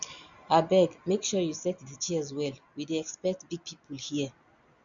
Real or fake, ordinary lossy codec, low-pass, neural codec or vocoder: real; none; 7.2 kHz; none